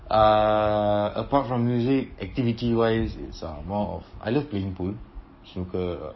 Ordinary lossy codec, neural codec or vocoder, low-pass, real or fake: MP3, 24 kbps; codec, 44.1 kHz, 7.8 kbps, DAC; 7.2 kHz; fake